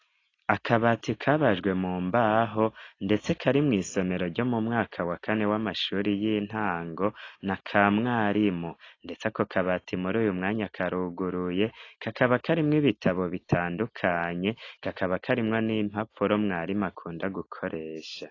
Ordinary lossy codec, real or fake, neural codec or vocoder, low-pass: AAC, 32 kbps; real; none; 7.2 kHz